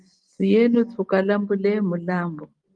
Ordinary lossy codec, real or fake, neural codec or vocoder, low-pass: Opus, 24 kbps; real; none; 9.9 kHz